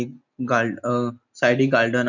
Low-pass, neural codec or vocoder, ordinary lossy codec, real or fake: 7.2 kHz; none; none; real